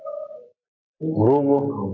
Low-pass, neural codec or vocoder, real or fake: 7.2 kHz; none; real